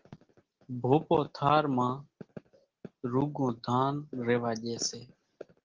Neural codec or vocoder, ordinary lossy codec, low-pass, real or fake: none; Opus, 16 kbps; 7.2 kHz; real